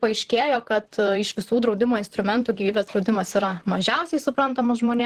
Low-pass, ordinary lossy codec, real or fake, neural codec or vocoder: 14.4 kHz; Opus, 16 kbps; fake; vocoder, 44.1 kHz, 128 mel bands, Pupu-Vocoder